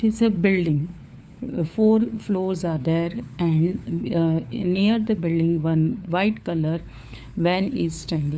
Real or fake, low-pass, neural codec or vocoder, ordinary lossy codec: fake; none; codec, 16 kHz, 4 kbps, FunCodec, trained on LibriTTS, 50 frames a second; none